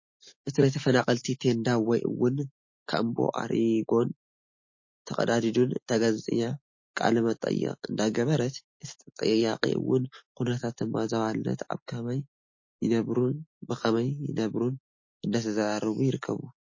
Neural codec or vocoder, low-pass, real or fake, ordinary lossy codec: none; 7.2 kHz; real; MP3, 32 kbps